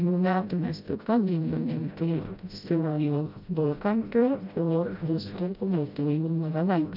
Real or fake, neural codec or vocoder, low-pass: fake; codec, 16 kHz, 0.5 kbps, FreqCodec, smaller model; 5.4 kHz